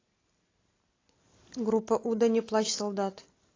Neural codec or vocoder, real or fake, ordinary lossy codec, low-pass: none; real; AAC, 32 kbps; 7.2 kHz